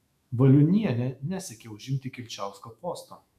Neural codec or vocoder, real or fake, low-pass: autoencoder, 48 kHz, 128 numbers a frame, DAC-VAE, trained on Japanese speech; fake; 14.4 kHz